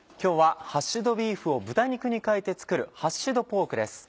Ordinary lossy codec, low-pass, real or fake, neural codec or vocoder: none; none; real; none